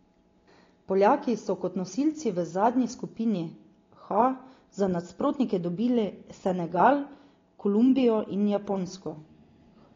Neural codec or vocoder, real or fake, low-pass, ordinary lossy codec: none; real; 7.2 kHz; AAC, 32 kbps